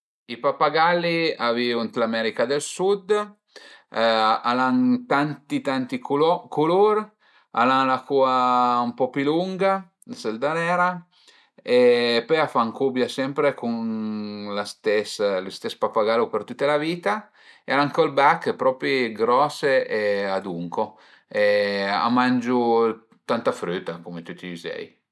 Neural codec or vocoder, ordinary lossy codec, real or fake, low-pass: none; none; real; none